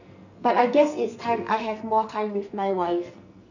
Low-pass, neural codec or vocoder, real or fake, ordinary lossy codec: 7.2 kHz; codec, 44.1 kHz, 2.6 kbps, SNAC; fake; none